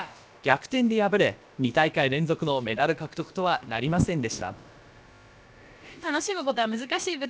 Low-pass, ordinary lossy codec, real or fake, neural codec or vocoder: none; none; fake; codec, 16 kHz, about 1 kbps, DyCAST, with the encoder's durations